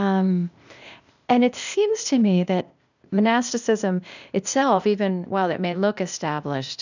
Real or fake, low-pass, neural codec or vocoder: fake; 7.2 kHz; codec, 16 kHz, 0.8 kbps, ZipCodec